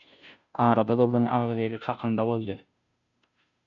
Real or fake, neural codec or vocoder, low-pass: fake; codec, 16 kHz, 0.5 kbps, FunCodec, trained on Chinese and English, 25 frames a second; 7.2 kHz